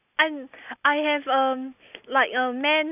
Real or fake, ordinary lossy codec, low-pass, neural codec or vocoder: real; none; 3.6 kHz; none